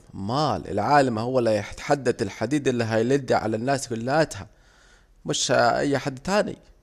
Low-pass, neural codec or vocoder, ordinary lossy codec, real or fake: 14.4 kHz; none; Opus, 64 kbps; real